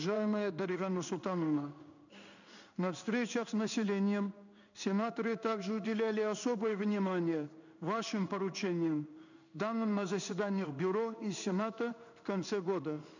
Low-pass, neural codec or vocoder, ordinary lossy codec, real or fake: 7.2 kHz; codec, 16 kHz in and 24 kHz out, 1 kbps, XY-Tokenizer; none; fake